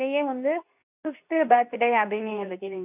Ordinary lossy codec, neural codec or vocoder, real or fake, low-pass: none; codec, 24 kHz, 0.9 kbps, WavTokenizer, medium speech release version 2; fake; 3.6 kHz